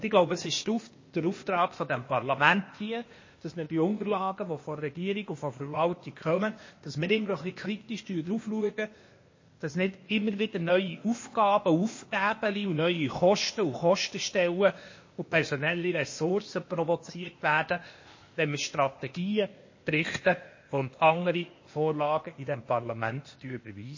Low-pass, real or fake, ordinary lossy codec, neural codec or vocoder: 7.2 kHz; fake; MP3, 32 kbps; codec, 16 kHz, 0.8 kbps, ZipCodec